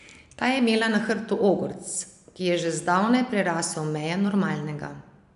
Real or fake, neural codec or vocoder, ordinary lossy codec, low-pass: real; none; none; 10.8 kHz